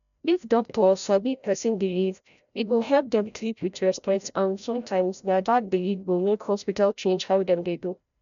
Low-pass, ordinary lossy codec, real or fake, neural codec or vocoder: 7.2 kHz; none; fake; codec, 16 kHz, 0.5 kbps, FreqCodec, larger model